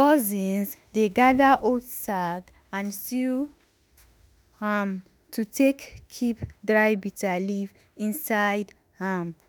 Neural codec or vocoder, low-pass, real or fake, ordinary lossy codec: autoencoder, 48 kHz, 32 numbers a frame, DAC-VAE, trained on Japanese speech; none; fake; none